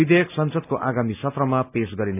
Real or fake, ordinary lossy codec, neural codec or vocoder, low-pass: real; none; none; 3.6 kHz